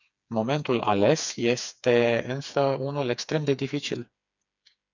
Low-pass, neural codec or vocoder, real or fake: 7.2 kHz; codec, 16 kHz, 4 kbps, FreqCodec, smaller model; fake